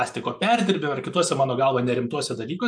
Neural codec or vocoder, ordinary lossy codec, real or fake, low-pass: none; MP3, 64 kbps; real; 9.9 kHz